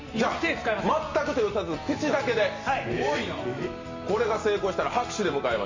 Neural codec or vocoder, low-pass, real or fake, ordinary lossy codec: none; 7.2 kHz; real; MP3, 32 kbps